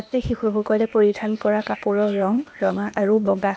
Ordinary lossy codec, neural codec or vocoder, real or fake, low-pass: none; codec, 16 kHz, 0.8 kbps, ZipCodec; fake; none